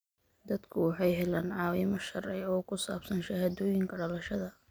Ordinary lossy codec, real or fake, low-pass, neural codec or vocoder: none; real; none; none